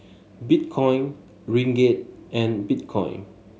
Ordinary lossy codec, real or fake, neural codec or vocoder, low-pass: none; real; none; none